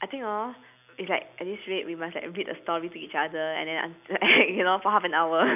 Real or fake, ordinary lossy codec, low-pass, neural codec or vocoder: real; none; 3.6 kHz; none